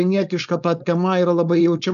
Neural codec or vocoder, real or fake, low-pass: codec, 16 kHz, 4.8 kbps, FACodec; fake; 7.2 kHz